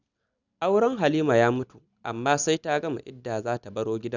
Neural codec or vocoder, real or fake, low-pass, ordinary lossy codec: none; real; 7.2 kHz; none